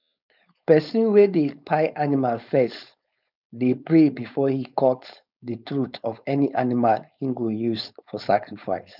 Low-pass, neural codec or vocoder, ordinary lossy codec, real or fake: 5.4 kHz; codec, 16 kHz, 4.8 kbps, FACodec; none; fake